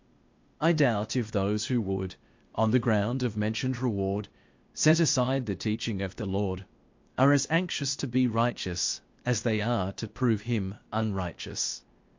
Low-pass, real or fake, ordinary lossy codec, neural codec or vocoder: 7.2 kHz; fake; MP3, 48 kbps; codec, 16 kHz, 0.8 kbps, ZipCodec